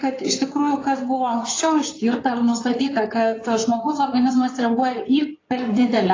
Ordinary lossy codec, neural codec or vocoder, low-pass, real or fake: AAC, 32 kbps; codec, 16 kHz in and 24 kHz out, 2.2 kbps, FireRedTTS-2 codec; 7.2 kHz; fake